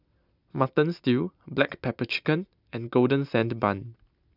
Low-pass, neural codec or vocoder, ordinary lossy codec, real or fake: 5.4 kHz; none; none; real